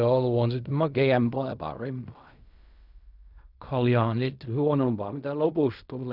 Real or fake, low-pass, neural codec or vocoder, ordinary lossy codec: fake; 5.4 kHz; codec, 16 kHz in and 24 kHz out, 0.4 kbps, LongCat-Audio-Codec, fine tuned four codebook decoder; none